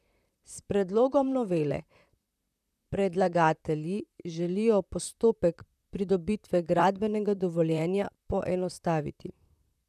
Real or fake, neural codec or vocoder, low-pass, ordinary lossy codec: fake; vocoder, 44.1 kHz, 128 mel bands, Pupu-Vocoder; 14.4 kHz; none